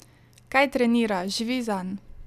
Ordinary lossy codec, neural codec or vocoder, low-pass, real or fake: none; none; 14.4 kHz; real